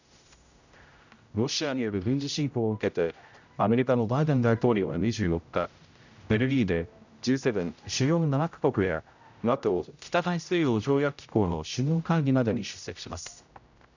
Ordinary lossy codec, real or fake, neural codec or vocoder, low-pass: none; fake; codec, 16 kHz, 0.5 kbps, X-Codec, HuBERT features, trained on general audio; 7.2 kHz